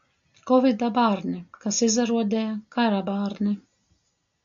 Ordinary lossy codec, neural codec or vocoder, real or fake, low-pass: AAC, 64 kbps; none; real; 7.2 kHz